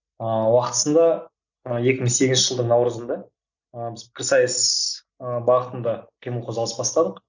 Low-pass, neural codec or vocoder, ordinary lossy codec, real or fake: 7.2 kHz; none; none; real